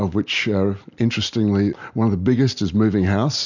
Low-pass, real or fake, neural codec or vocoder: 7.2 kHz; real; none